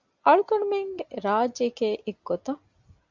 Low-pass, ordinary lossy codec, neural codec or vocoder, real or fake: 7.2 kHz; Opus, 64 kbps; none; real